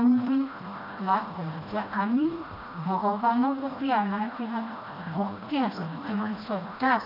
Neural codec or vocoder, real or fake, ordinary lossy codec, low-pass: codec, 16 kHz, 1 kbps, FreqCodec, smaller model; fake; none; 5.4 kHz